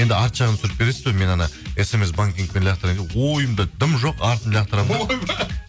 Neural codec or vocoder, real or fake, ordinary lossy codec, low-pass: none; real; none; none